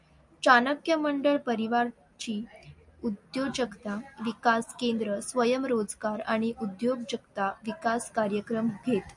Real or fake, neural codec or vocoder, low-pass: real; none; 10.8 kHz